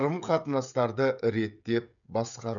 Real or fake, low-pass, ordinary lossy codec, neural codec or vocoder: fake; 7.2 kHz; none; codec, 16 kHz, 16 kbps, FreqCodec, smaller model